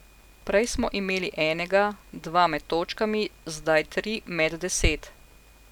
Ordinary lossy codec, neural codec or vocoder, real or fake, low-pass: none; none; real; 19.8 kHz